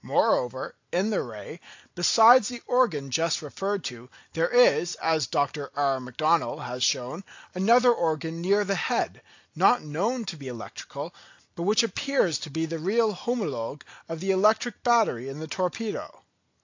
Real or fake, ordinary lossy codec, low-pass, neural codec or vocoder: real; AAC, 48 kbps; 7.2 kHz; none